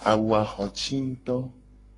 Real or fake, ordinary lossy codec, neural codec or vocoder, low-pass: fake; AAC, 32 kbps; codec, 44.1 kHz, 7.8 kbps, Pupu-Codec; 10.8 kHz